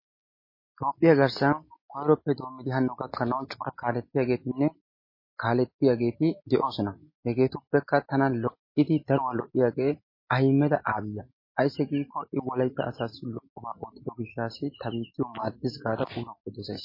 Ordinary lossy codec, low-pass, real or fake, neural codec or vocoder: MP3, 24 kbps; 5.4 kHz; real; none